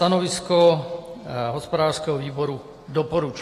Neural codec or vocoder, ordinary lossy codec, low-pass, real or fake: none; AAC, 48 kbps; 14.4 kHz; real